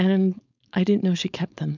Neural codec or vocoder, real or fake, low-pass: codec, 16 kHz, 4.8 kbps, FACodec; fake; 7.2 kHz